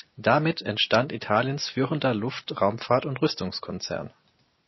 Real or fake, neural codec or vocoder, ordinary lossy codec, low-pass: real; none; MP3, 24 kbps; 7.2 kHz